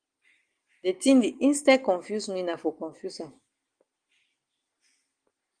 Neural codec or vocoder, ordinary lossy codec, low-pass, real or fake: none; Opus, 24 kbps; 9.9 kHz; real